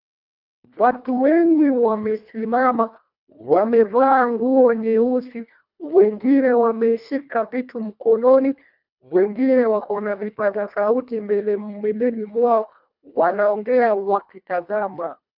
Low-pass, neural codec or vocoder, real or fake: 5.4 kHz; codec, 24 kHz, 1.5 kbps, HILCodec; fake